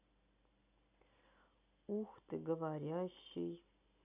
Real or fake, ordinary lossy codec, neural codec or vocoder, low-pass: real; none; none; 3.6 kHz